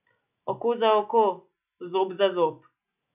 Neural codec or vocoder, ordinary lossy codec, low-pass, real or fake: none; none; 3.6 kHz; real